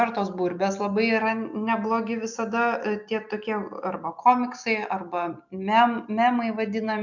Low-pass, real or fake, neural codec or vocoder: 7.2 kHz; real; none